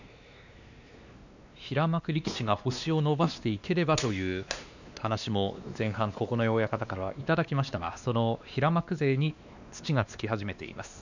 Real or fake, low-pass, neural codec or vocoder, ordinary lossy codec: fake; 7.2 kHz; codec, 16 kHz, 2 kbps, X-Codec, WavLM features, trained on Multilingual LibriSpeech; none